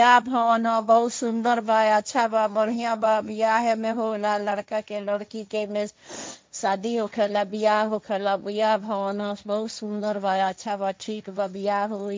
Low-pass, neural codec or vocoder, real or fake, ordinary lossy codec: none; codec, 16 kHz, 1.1 kbps, Voila-Tokenizer; fake; none